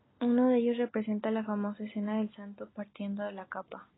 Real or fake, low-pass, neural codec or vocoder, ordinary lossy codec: real; 7.2 kHz; none; AAC, 16 kbps